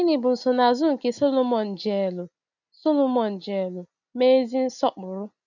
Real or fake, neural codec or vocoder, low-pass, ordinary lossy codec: real; none; 7.2 kHz; none